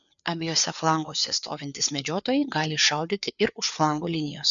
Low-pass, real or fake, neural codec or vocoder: 7.2 kHz; fake; codec, 16 kHz, 4 kbps, FreqCodec, larger model